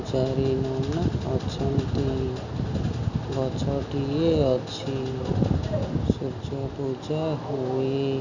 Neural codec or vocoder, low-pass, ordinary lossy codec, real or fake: none; 7.2 kHz; none; real